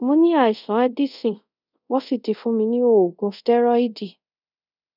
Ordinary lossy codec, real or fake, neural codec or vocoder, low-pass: none; fake; codec, 24 kHz, 0.5 kbps, DualCodec; 5.4 kHz